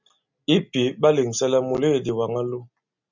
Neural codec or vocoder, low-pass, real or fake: none; 7.2 kHz; real